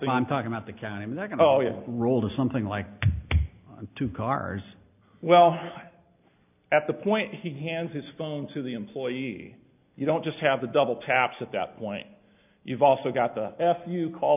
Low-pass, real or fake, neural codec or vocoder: 3.6 kHz; real; none